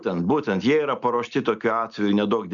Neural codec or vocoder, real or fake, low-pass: none; real; 7.2 kHz